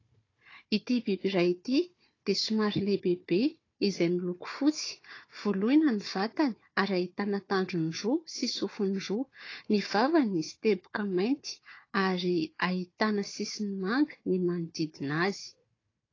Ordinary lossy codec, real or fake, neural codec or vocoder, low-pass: AAC, 32 kbps; fake; codec, 16 kHz, 4 kbps, FunCodec, trained on Chinese and English, 50 frames a second; 7.2 kHz